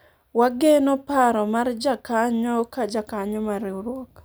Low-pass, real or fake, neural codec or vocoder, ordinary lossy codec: none; real; none; none